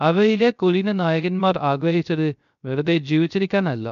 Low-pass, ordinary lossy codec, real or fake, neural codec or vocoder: 7.2 kHz; MP3, 64 kbps; fake; codec, 16 kHz, 0.3 kbps, FocalCodec